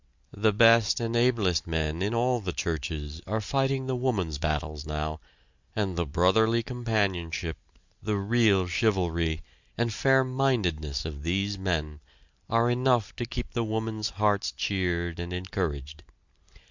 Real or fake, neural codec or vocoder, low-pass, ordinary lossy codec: real; none; 7.2 kHz; Opus, 64 kbps